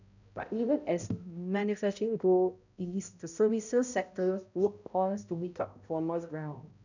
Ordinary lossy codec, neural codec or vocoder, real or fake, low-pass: none; codec, 16 kHz, 0.5 kbps, X-Codec, HuBERT features, trained on balanced general audio; fake; 7.2 kHz